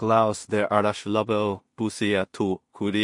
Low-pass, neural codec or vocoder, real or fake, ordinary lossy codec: 10.8 kHz; codec, 16 kHz in and 24 kHz out, 0.4 kbps, LongCat-Audio-Codec, two codebook decoder; fake; MP3, 48 kbps